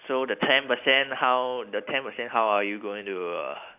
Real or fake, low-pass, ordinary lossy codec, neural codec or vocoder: real; 3.6 kHz; AAC, 32 kbps; none